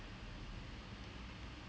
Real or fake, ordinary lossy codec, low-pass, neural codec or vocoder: real; none; none; none